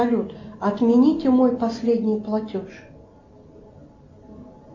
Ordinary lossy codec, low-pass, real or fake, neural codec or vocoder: MP3, 48 kbps; 7.2 kHz; real; none